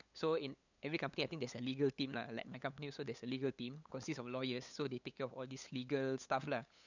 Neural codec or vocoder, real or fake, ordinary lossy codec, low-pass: codec, 16 kHz, 8 kbps, FunCodec, trained on LibriTTS, 25 frames a second; fake; none; 7.2 kHz